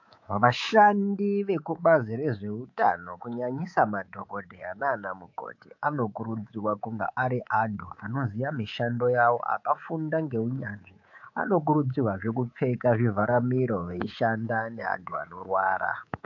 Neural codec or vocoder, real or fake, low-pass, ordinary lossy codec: codec, 24 kHz, 3.1 kbps, DualCodec; fake; 7.2 kHz; AAC, 48 kbps